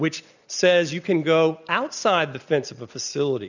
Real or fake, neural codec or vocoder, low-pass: real; none; 7.2 kHz